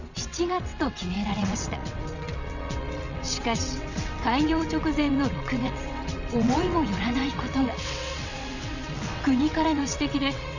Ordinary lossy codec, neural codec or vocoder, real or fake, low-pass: none; vocoder, 22.05 kHz, 80 mel bands, WaveNeXt; fake; 7.2 kHz